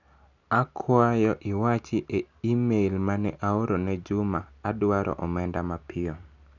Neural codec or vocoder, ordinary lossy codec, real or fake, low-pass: none; none; real; 7.2 kHz